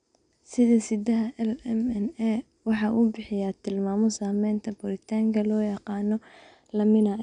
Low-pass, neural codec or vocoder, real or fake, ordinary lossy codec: 9.9 kHz; none; real; none